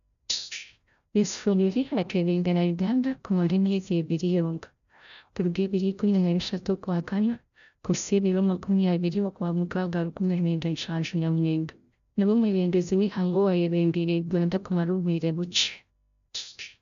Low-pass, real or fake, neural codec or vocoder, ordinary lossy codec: 7.2 kHz; fake; codec, 16 kHz, 0.5 kbps, FreqCodec, larger model; AAC, 96 kbps